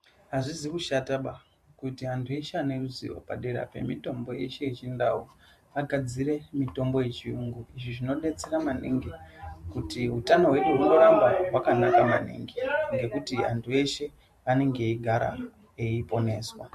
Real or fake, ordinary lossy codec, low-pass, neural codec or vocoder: fake; MP3, 64 kbps; 14.4 kHz; vocoder, 44.1 kHz, 128 mel bands every 512 samples, BigVGAN v2